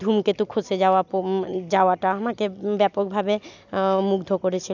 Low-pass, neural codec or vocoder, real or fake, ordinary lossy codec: 7.2 kHz; none; real; none